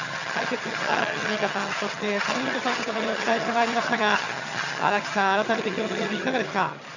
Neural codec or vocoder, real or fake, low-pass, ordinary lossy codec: vocoder, 22.05 kHz, 80 mel bands, HiFi-GAN; fake; 7.2 kHz; none